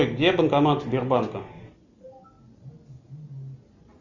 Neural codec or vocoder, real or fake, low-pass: none; real; 7.2 kHz